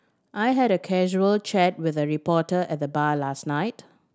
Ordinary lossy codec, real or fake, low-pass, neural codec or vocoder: none; real; none; none